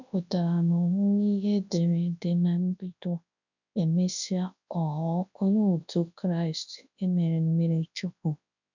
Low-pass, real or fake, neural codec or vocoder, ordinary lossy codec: 7.2 kHz; fake; codec, 24 kHz, 0.9 kbps, WavTokenizer, large speech release; none